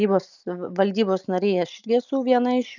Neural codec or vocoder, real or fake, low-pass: none; real; 7.2 kHz